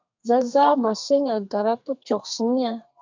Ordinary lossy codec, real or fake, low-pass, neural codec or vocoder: MP3, 64 kbps; fake; 7.2 kHz; codec, 32 kHz, 1.9 kbps, SNAC